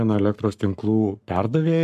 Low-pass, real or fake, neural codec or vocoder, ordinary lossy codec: 14.4 kHz; fake; codec, 44.1 kHz, 7.8 kbps, Pupu-Codec; MP3, 96 kbps